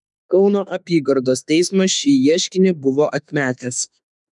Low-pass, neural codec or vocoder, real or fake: 10.8 kHz; autoencoder, 48 kHz, 32 numbers a frame, DAC-VAE, trained on Japanese speech; fake